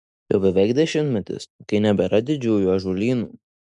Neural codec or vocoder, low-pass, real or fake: none; 10.8 kHz; real